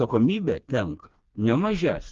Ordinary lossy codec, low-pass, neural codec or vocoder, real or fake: Opus, 32 kbps; 7.2 kHz; codec, 16 kHz, 2 kbps, FreqCodec, smaller model; fake